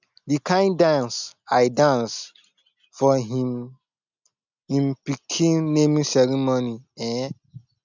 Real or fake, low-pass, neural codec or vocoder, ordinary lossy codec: real; 7.2 kHz; none; none